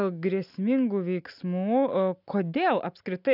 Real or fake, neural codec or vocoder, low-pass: real; none; 5.4 kHz